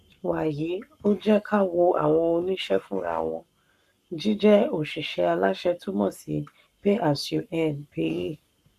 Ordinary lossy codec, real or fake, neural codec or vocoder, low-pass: none; fake; codec, 44.1 kHz, 7.8 kbps, Pupu-Codec; 14.4 kHz